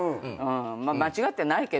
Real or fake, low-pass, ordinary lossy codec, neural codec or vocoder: real; none; none; none